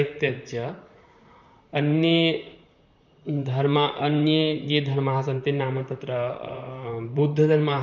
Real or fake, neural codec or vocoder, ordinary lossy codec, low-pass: fake; vocoder, 44.1 kHz, 128 mel bands, Pupu-Vocoder; none; 7.2 kHz